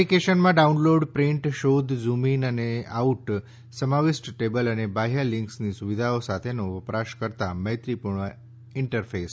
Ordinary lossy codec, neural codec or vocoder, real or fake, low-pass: none; none; real; none